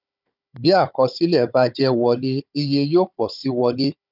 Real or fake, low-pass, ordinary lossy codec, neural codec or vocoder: fake; 5.4 kHz; none; codec, 16 kHz, 16 kbps, FunCodec, trained on Chinese and English, 50 frames a second